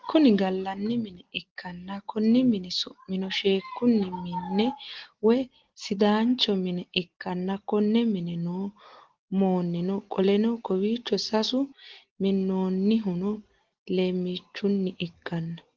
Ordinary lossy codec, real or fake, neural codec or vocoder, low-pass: Opus, 16 kbps; real; none; 7.2 kHz